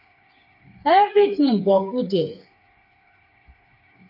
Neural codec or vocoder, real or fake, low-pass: codec, 16 kHz, 4 kbps, FreqCodec, smaller model; fake; 5.4 kHz